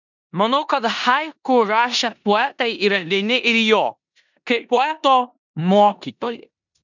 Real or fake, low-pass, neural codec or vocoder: fake; 7.2 kHz; codec, 16 kHz in and 24 kHz out, 0.9 kbps, LongCat-Audio-Codec, four codebook decoder